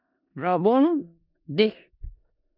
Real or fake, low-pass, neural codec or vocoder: fake; 5.4 kHz; codec, 16 kHz in and 24 kHz out, 0.4 kbps, LongCat-Audio-Codec, four codebook decoder